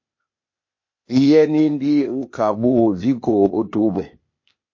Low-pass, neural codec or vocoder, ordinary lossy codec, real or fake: 7.2 kHz; codec, 16 kHz, 0.8 kbps, ZipCodec; MP3, 32 kbps; fake